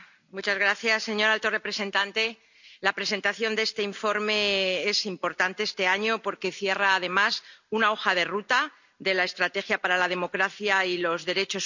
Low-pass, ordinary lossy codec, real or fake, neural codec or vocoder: 7.2 kHz; none; real; none